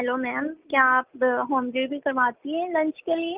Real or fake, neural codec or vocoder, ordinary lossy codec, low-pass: real; none; Opus, 24 kbps; 3.6 kHz